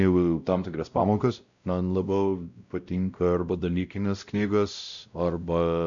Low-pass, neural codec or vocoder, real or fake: 7.2 kHz; codec, 16 kHz, 0.5 kbps, X-Codec, WavLM features, trained on Multilingual LibriSpeech; fake